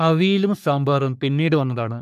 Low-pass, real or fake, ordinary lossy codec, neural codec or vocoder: 14.4 kHz; fake; none; codec, 44.1 kHz, 3.4 kbps, Pupu-Codec